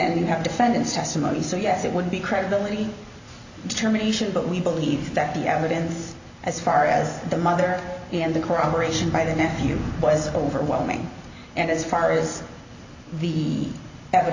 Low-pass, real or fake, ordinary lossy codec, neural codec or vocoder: 7.2 kHz; real; AAC, 48 kbps; none